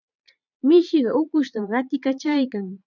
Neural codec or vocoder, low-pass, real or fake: vocoder, 22.05 kHz, 80 mel bands, Vocos; 7.2 kHz; fake